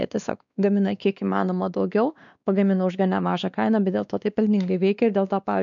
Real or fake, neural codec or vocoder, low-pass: fake; codec, 16 kHz, 2 kbps, X-Codec, WavLM features, trained on Multilingual LibriSpeech; 7.2 kHz